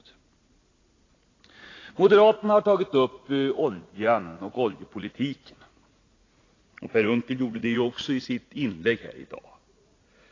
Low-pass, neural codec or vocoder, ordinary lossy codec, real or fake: 7.2 kHz; vocoder, 44.1 kHz, 80 mel bands, Vocos; AAC, 32 kbps; fake